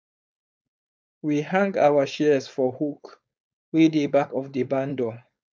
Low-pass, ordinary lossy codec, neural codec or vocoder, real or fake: none; none; codec, 16 kHz, 4.8 kbps, FACodec; fake